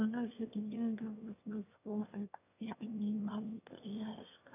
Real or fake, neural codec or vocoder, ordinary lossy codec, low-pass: fake; autoencoder, 22.05 kHz, a latent of 192 numbers a frame, VITS, trained on one speaker; none; 3.6 kHz